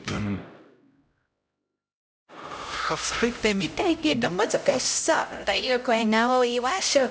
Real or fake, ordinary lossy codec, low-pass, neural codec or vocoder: fake; none; none; codec, 16 kHz, 0.5 kbps, X-Codec, HuBERT features, trained on LibriSpeech